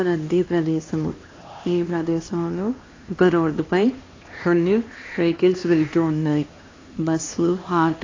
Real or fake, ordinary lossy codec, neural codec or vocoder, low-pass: fake; AAC, 32 kbps; codec, 16 kHz, 2 kbps, X-Codec, HuBERT features, trained on LibriSpeech; 7.2 kHz